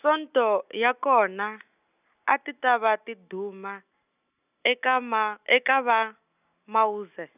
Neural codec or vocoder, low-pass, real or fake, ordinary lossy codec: none; 3.6 kHz; real; none